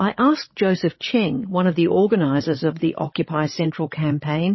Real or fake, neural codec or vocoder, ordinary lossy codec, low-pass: fake; codec, 16 kHz, 16 kbps, FreqCodec, larger model; MP3, 24 kbps; 7.2 kHz